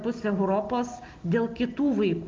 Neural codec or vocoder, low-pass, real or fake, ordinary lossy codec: none; 7.2 kHz; real; Opus, 32 kbps